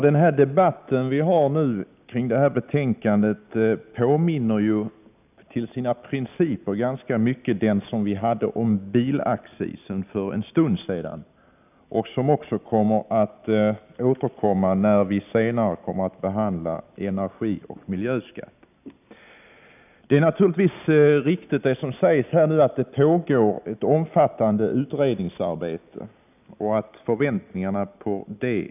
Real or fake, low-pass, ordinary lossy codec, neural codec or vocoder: real; 3.6 kHz; none; none